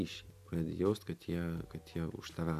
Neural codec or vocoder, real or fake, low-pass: none; real; 14.4 kHz